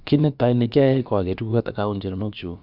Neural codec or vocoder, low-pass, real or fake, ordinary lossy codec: codec, 16 kHz, about 1 kbps, DyCAST, with the encoder's durations; 5.4 kHz; fake; none